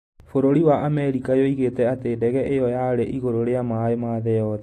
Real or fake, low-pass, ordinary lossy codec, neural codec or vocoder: real; 14.4 kHz; AAC, 48 kbps; none